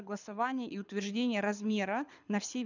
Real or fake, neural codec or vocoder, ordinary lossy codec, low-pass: fake; codec, 24 kHz, 6 kbps, HILCodec; none; 7.2 kHz